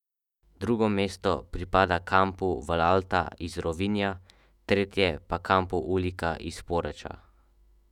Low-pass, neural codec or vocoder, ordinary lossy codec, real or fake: 19.8 kHz; autoencoder, 48 kHz, 128 numbers a frame, DAC-VAE, trained on Japanese speech; none; fake